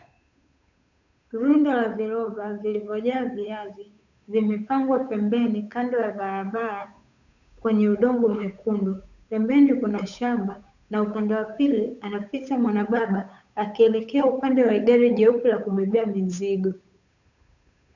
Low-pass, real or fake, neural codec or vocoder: 7.2 kHz; fake; codec, 16 kHz, 8 kbps, FunCodec, trained on Chinese and English, 25 frames a second